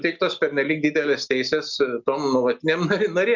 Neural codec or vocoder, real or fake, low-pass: none; real; 7.2 kHz